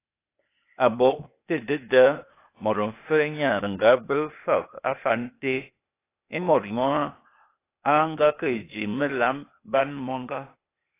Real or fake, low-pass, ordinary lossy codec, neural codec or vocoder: fake; 3.6 kHz; AAC, 24 kbps; codec, 16 kHz, 0.8 kbps, ZipCodec